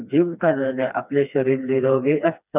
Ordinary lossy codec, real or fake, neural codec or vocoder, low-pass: none; fake; codec, 16 kHz, 2 kbps, FreqCodec, smaller model; 3.6 kHz